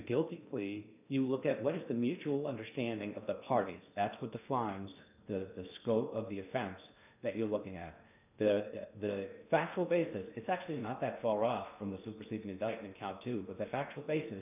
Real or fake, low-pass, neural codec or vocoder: fake; 3.6 kHz; codec, 16 kHz in and 24 kHz out, 0.6 kbps, FocalCodec, streaming, 2048 codes